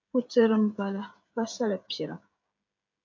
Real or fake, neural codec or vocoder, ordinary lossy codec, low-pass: fake; codec, 16 kHz, 16 kbps, FreqCodec, smaller model; MP3, 64 kbps; 7.2 kHz